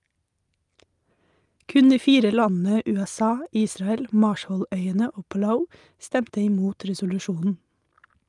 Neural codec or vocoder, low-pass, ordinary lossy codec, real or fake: none; 10.8 kHz; Opus, 32 kbps; real